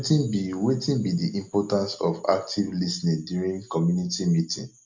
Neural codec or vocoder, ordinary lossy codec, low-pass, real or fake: none; MP3, 64 kbps; 7.2 kHz; real